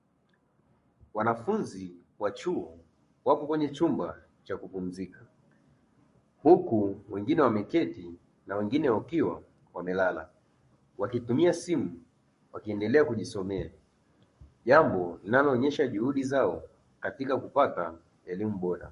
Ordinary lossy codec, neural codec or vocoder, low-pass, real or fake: MP3, 48 kbps; codec, 44.1 kHz, 7.8 kbps, Pupu-Codec; 14.4 kHz; fake